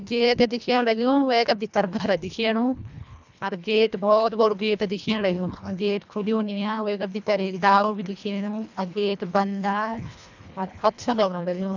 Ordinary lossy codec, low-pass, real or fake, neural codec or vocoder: none; 7.2 kHz; fake; codec, 24 kHz, 1.5 kbps, HILCodec